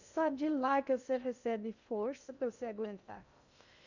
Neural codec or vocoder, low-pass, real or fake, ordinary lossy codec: codec, 16 kHz in and 24 kHz out, 0.6 kbps, FocalCodec, streaming, 2048 codes; 7.2 kHz; fake; none